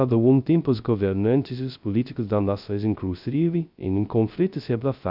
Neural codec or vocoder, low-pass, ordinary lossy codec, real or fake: codec, 16 kHz, 0.2 kbps, FocalCodec; 5.4 kHz; none; fake